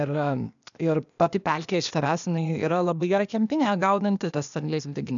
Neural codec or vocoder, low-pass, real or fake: codec, 16 kHz, 0.8 kbps, ZipCodec; 7.2 kHz; fake